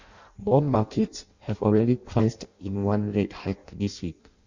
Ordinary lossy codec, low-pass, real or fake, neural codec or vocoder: Opus, 64 kbps; 7.2 kHz; fake; codec, 16 kHz in and 24 kHz out, 0.6 kbps, FireRedTTS-2 codec